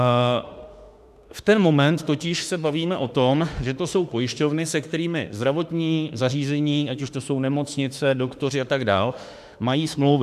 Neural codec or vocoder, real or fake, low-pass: autoencoder, 48 kHz, 32 numbers a frame, DAC-VAE, trained on Japanese speech; fake; 14.4 kHz